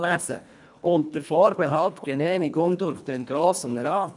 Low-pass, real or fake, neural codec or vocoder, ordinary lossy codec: 10.8 kHz; fake; codec, 24 kHz, 1.5 kbps, HILCodec; none